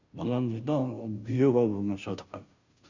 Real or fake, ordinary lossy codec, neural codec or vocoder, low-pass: fake; Opus, 64 kbps; codec, 16 kHz, 0.5 kbps, FunCodec, trained on Chinese and English, 25 frames a second; 7.2 kHz